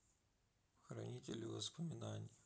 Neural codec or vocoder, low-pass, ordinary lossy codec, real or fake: none; none; none; real